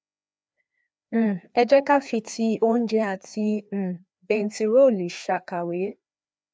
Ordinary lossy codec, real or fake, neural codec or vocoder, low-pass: none; fake; codec, 16 kHz, 2 kbps, FreqCodec, larger model; none